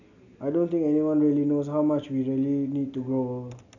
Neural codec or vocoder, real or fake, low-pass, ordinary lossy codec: none; real; 7.2 kHz; none